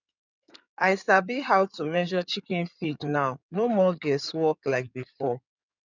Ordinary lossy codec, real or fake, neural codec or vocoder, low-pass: none; fake; codec, 16 kHz in and 24 kHz out, 2.2 kbps, FireRedTTS-2 codec; 7.2 kHz